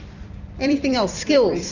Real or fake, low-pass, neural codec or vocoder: fake; 7.2 kHz; vocoder, 44.1 kHz, 128 mel bands every 256 samples, BigVGAN v2